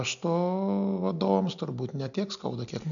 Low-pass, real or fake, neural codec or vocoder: 7.2 kHz; real; none